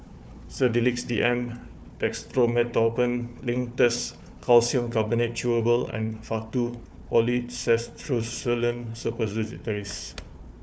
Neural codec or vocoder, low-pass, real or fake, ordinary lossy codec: codec, 16 kHz, 4 kbps, FunCodec, trained on Chinese and English, 50 frames a second; none; fake; none